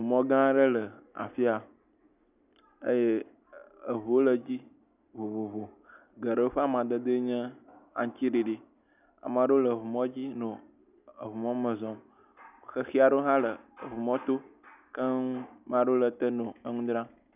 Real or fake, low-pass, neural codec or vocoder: real; 3.6 kHz; none